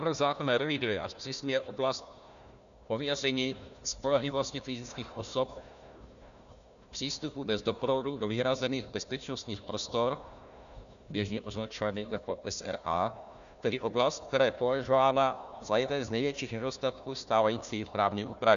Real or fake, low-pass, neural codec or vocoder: fake; 7.2 kHz; codec, 16 kHz, 1 kbps, FunCodec, trained on Chinese and English, 50 frames a second